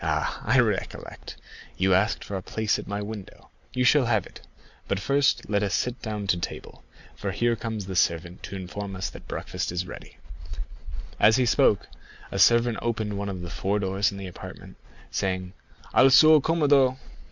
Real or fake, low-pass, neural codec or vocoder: real; 7.2 kHz; none